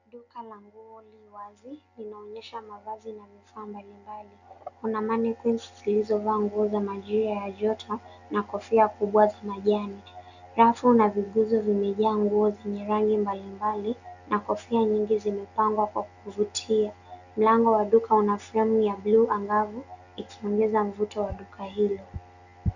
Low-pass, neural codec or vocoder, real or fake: 7.2 kHz; none; real